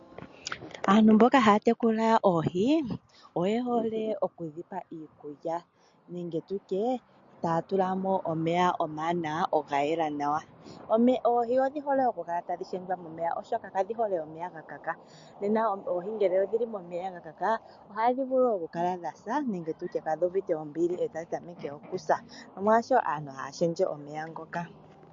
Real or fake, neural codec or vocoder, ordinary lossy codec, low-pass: real; none; MP3, 48 kbps; 7.2 kHz